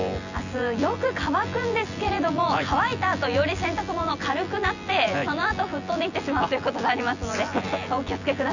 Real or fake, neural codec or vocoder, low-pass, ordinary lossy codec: fake; vocoder, 24 kHz, 100 mel bands, Vocos; 7.2 kHz; none